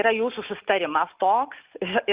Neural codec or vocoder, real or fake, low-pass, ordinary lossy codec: none; real; 3.6 kHz; Opus, 32 kbps